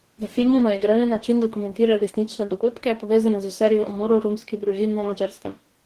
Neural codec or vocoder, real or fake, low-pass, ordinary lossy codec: codec, 44.1 kHz, 2.6 kbps, DAC; fake; 14.4 kHz; Opus, 16 kbps